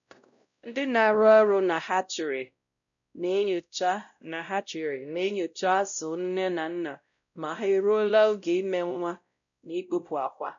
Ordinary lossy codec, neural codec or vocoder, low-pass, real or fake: none; codec, 16 kHz, 0.5 kbps, X-Codec, WavLM features, trained on Multilingual LibriSpeech; 7.2 kHz; fake